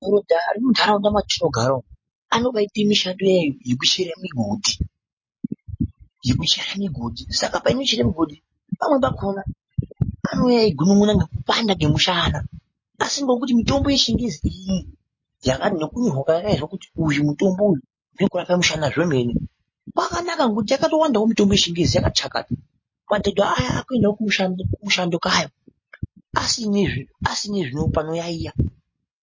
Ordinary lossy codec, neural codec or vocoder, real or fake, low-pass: MP3, 32 kbps; none; real; 7.2 kHz